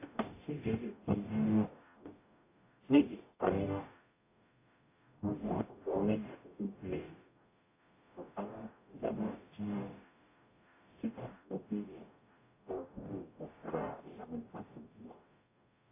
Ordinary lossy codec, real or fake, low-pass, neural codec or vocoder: AAC, 32 kbps; fake; 3.6 kHz; codec, 44.1 kHz, 0.9 kbps, DAC